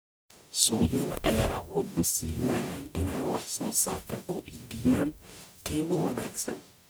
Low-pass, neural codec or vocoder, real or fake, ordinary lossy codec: none; codec, 44.1 kHz, 0.9 kbps, DAC; fake; none